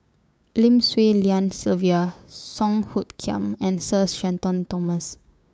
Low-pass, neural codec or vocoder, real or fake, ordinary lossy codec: none; codec, 16 kHz, 6 kbps, DAC; fake; none